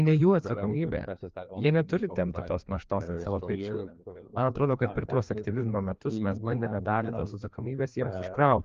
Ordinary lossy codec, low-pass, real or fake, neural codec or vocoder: Opus, 24 kbps; 7.2 kHz; fake; codec, 16 kHz, 2 kbps, FreqCodec, larger model